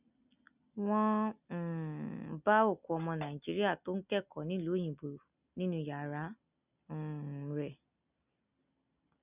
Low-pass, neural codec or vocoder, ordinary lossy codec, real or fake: 3.6 kHz; none; none; real